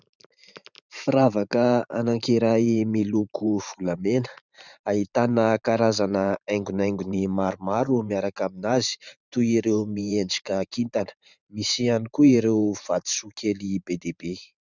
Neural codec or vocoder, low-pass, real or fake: vocoder, 44.1 kHz, 128 mel bands every 512 samples, BigVGAN v2; 7.2 kHz; fake